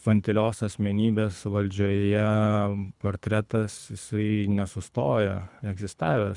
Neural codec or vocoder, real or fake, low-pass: codec, 24 kHz, 3 kbps, HILCodec; fake; 10.8 kHz